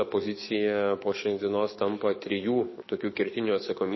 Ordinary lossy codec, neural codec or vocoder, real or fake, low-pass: MP3, 24 kbps; codec, 44.1 kHz, 7.8 kbps, DAC; fake; 7.2 kHz